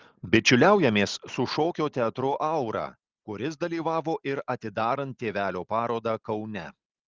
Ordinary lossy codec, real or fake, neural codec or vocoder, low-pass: Opus, 32 kbps; real; none; 7.2 kHz